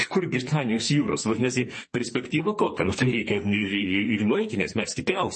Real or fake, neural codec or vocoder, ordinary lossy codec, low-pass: fake; codec, 44.1 kHz, 2.6 kbps, SNAC; MP3, 32 kbps; 10.8 kHz